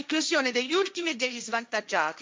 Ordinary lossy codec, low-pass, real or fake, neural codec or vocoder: none; none; fake; codec, 16 kHz, 1.1 kbps, Voila-Tokenizer